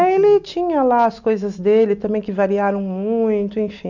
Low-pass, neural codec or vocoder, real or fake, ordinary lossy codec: 7.2 kHz; none; real; none